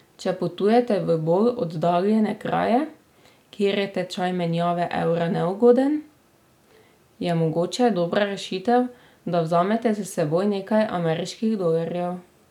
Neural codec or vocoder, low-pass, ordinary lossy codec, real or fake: none; 19.8 kHz; none; real